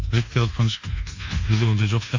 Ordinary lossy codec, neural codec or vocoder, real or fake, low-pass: AAC, 48 kbps; codec, 24 kHz, 1.2 kbps, DualCodec; fake; 7.2 kHz